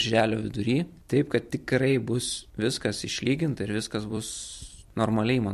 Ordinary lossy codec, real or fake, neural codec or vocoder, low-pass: MP3, 64 kbps; fake; vocoder, 44.1 kHz, 128 mel bands every 256 samples, BigVGAN v2; 14.4 kHz